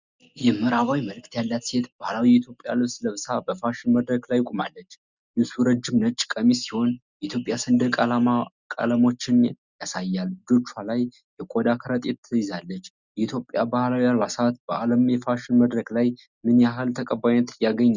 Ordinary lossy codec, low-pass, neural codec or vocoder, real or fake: Opus, 64 kbps; 7.2 kHz; none; real